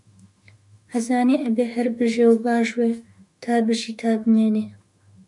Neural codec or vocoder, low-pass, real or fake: autoencoder, 48 kHz, 32 numbers a frame, DAC-VAE, trained on Japanese speech; 10.8 kHz; fake